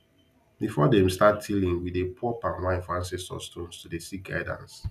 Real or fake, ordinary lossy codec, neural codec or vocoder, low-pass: real; none; none; 14.4 kHz